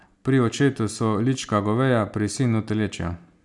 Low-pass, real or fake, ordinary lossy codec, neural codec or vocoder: 10.8 kHz; real; none; none